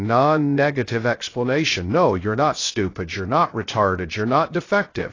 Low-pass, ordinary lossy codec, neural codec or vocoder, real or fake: 7.2 kHz; AAC, 32 kbps; codec, 16 kHz, 0.3 kbps, FocalCodec; fake